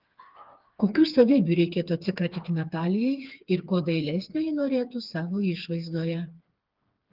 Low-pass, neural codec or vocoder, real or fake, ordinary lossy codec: 5.4 kHz; codec, 16 kHz, 4 kbps, FreqCodec, smaller model; fake; Opus, 32 kbps